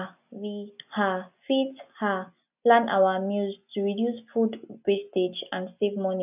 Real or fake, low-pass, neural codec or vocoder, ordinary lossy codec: real; 3.6 kHz; none; none